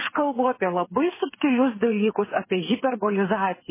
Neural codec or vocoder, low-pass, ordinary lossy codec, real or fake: codec, 16 kHz, 8 kbps, FreqCodec, smaller model; 3.6 kHz; MP3, 16 kbps; fake